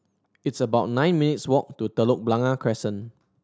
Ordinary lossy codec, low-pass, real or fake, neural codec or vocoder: none; none; real; none